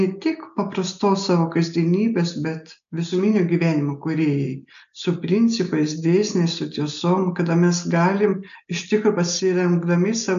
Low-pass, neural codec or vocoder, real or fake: 7.2 kHz; none; real